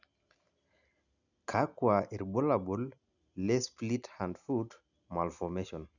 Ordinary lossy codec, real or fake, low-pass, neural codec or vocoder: none; real; 7.2 kHz; none